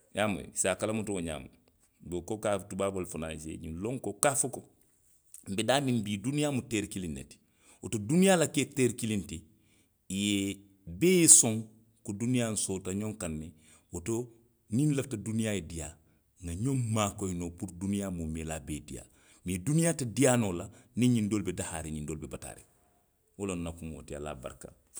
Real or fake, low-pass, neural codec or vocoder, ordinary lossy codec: real; none; none; none